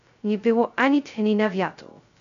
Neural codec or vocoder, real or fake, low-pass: codec, 16 kHz, 0.2 kbps, FocalCodec; fake; 7.2 kHz